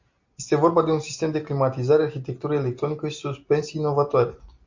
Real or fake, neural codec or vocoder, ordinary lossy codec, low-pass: real; none; MP3, 48 kbps; 7.2 kHz